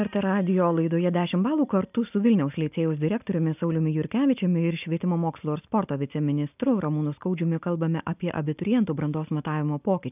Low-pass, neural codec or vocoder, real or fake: 3.6 kHz; none; real